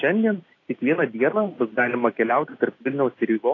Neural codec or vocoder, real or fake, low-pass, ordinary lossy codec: none; real; 7.2 kHz; AAC, 32 kbps